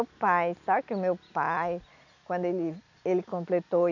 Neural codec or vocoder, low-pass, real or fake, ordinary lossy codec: none; 7.2 kHz; real; none